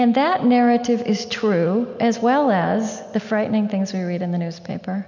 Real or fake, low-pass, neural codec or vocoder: real; 7.2 kHz; none